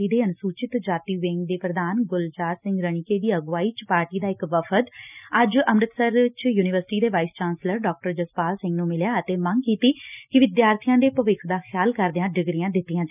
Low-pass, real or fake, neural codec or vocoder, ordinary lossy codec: 3.6 kHz; real; none; none